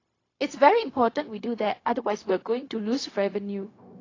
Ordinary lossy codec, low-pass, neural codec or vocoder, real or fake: AAC, 32 kbps; 7.2 kHz; codec, 16 kHz, 0.4 kbps, LongCat-Audio-Codec; fake